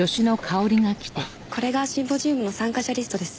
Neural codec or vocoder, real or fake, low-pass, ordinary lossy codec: none; real; none; none